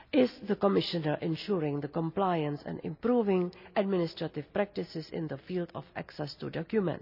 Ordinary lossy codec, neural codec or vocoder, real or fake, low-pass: none; none; real; 5.4 kHz